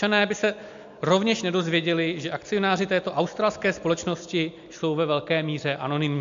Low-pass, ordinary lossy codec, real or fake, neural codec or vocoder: 7.2 kHz; AAC, 64 kbps; real; none